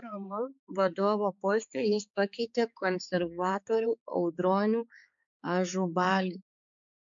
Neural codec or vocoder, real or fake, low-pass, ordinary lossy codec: codec, 16 kHz, 4 kbps, X-Codec, HuBERT features, trained on balanced general audio; fake; 7.2 kHz; MP3, 64 kbps